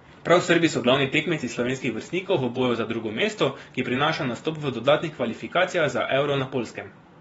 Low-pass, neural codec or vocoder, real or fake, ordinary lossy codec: 10.8 kHz; vocoder, 24 kHz, 100 mel bands, Vocos; fake; AAC, 24 kbps